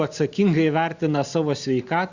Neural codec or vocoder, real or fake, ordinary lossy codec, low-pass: none; real; Opus, 64 kbps; 7.2 kHz